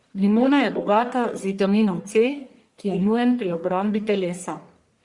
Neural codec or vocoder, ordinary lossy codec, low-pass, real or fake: codec, 44.1 kHz, 1.7 kbps, Pupu-Codec; Opus, 64 kbps; 10.8 kHz; fake